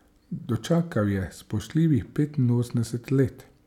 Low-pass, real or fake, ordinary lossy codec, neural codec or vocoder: 19.8 kHz; real; none; none